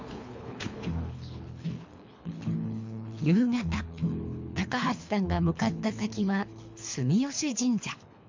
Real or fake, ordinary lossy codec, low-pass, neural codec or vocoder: fake; MP3, 64 kbps; 7.2 kHz; codec, 24 kHz, 3 kbps, HILCodec